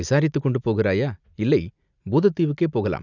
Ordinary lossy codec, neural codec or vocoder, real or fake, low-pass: none; none; real; 7.2 kHz